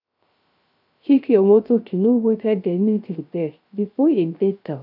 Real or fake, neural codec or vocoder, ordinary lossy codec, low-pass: fake; codec, 16 kHz, 0.3 kbps, FocalCodec; none; 5.4 kHz